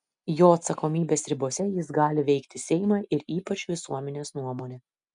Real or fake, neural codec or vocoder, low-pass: real; none; 9.9 kHz